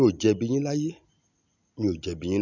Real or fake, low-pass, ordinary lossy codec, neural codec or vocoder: real; 7.2 kHz; none; none